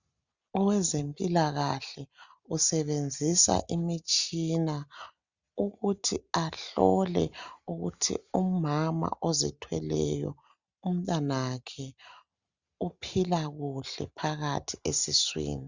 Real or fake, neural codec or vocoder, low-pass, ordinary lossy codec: real; none; 7.2 kHz; Opus, 64 kbps